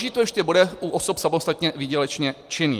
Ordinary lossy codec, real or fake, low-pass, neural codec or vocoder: Opus, 32 kbps; real; 14.4 kHz; none